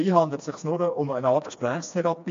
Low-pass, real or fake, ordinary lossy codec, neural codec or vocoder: 7.2 kHz; fake; MP3, 48 kbps; codec, 16 kHz, 2 kbps, FreqCodec, smaller model